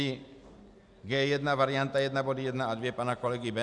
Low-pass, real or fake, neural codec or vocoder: 10.8 kHz; real; none